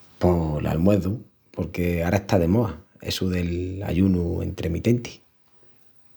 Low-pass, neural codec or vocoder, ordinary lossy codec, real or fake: none; none; none; real